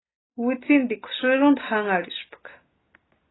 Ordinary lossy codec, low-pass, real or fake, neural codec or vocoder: AAC, 16 kbps; 7.2 kHz; real; none